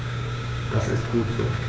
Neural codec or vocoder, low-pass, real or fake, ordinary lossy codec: codec, 16 kHz, 6 kbps, DAC; none; fake; none